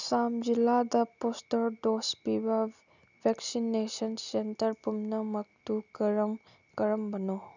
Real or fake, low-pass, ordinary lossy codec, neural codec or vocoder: real; 7.2 kHz; none; none